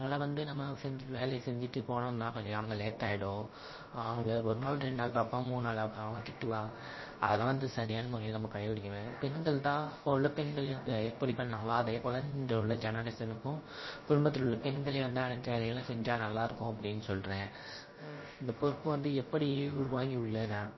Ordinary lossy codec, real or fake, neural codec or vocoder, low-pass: MP3, 24 kbps; fake; codec, 16 kHz, about 1 kbps, DyCAST, with the encoder's durations; 7.2 kHz